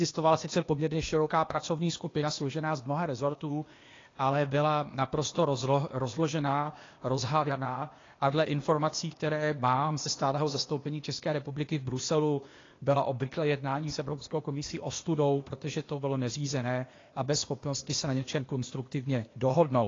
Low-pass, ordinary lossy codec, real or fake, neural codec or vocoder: 7.2 kHz; AAC, 32 kbps; fake; codec, 16 kHz, 0.8 kbps, ZipCodec